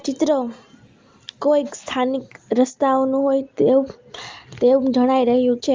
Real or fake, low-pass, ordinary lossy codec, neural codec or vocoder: real; 7.2 kHz; Opus, 32 kbps; none